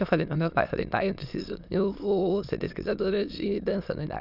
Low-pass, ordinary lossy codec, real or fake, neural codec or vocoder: 5.4 kHz; none; fake; autoencoder, 22.05 kHz, a latent of 192 numbers a frame, VITS, trained on many speakers